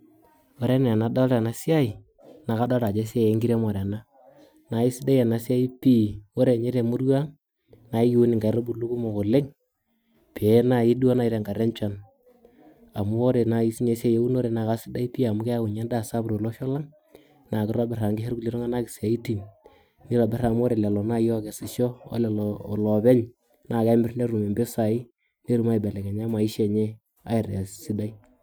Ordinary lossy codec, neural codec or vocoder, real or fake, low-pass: none; none; real; none